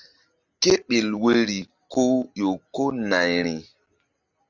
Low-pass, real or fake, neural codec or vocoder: 7.2 kHz; real; none